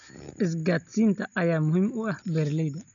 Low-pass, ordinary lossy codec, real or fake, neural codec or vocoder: 7.2 kHz; none; real; none